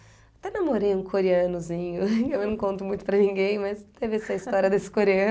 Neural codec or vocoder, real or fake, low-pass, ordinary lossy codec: none; real; none; none